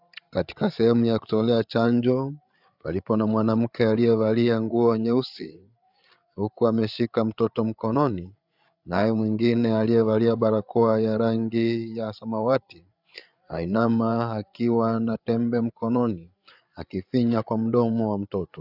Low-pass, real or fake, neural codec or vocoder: 5.4 kHz; fake; codec, 16 kHz, 8 kbps, FreqCodec, larger model